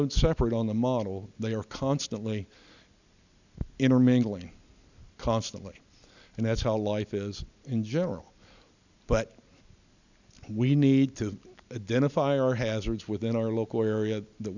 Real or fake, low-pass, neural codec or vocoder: real; 7.2 kHz; none